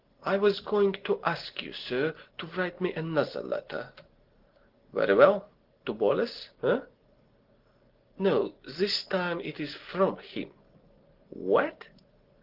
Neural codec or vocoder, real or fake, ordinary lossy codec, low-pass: none; real; Opus, 16 kbps; 5.4 kHz